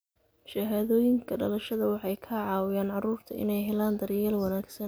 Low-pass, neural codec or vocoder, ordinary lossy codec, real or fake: none; none; none; real